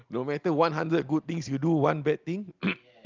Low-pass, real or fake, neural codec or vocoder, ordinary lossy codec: 7.2 kHz; real; none; Opus, 32 kbps